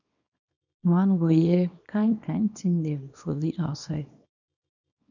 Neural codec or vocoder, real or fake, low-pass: codec, 24 kHz, 0.9 kbps, WavTokenizer, small release; fake; 7.2 kHz